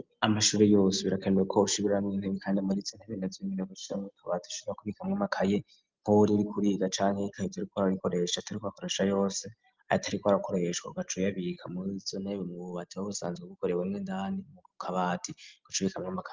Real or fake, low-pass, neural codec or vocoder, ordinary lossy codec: real; 7.2 kHz; none; Opus, 24 kbps